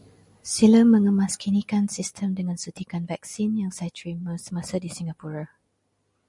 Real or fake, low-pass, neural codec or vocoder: real; 10.8 kHz; none